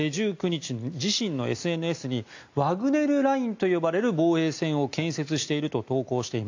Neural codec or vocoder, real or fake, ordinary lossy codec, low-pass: none; real; AAC, 48 kbps; 7.2 kHz